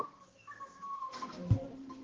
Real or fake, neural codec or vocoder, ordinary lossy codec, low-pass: fake; codec, 16 kHz, 1 kbps, X-Codec, HuBERT features, trained on balanced general audio; Opus, 16 kbps; 7.2 kHz